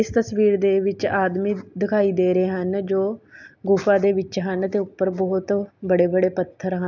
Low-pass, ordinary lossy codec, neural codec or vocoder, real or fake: 7.2 kHz; none; none; real